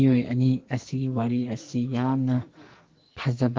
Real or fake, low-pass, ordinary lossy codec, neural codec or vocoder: fake; 7.2 kHz; Opus, 16 kbps; codec, 44.1 kHz, 2.6 kbps, SNAC